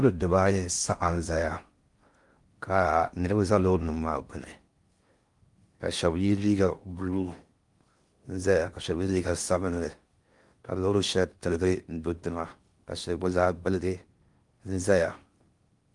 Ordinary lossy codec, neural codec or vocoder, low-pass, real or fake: Opus, 32 kbps; codec, 16 kHz in and 24 kHz out, 0.6 kbps, FocalCodec, streaming, 4096 codes; 10.8 kHz; fake